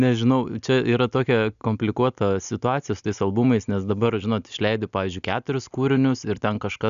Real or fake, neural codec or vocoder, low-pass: real; none; 7.2 kHz